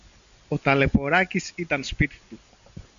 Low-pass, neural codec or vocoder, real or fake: 7.2 kHz; none; real